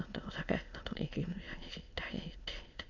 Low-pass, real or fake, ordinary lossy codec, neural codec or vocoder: 7.2 kHz; fake; none; autoencoder, 22.05 kHz, a latent of 192 numbers a frame, VITS, trained on many speakers